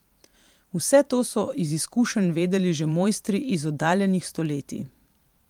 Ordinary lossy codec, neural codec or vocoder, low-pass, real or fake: Opus, 32 kbps; vocoder, 44.1 kHz, 128 mel bands every 512 samples, BigVGAN v2; 19.8 kHz; fake